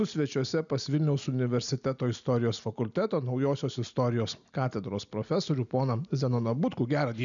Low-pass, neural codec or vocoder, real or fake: 7.2 kHz; none; real